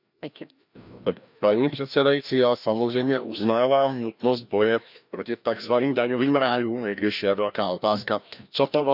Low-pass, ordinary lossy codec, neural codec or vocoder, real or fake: 5.4 kHz; none; codec, 16 kHz, 1 kbps, FreqCodec, larger model; fake